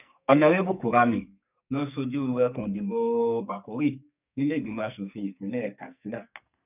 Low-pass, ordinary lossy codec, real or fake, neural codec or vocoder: 3.6 kHz; none; fake; codec, 44.1 kHz, 2.6 kbps, SNAC